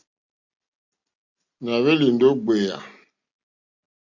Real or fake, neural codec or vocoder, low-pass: real; none; 7.2 kHz